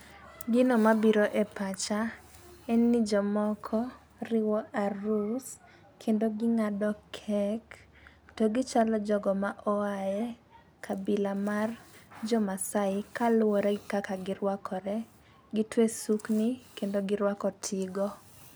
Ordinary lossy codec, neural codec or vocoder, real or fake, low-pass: none; none; real; none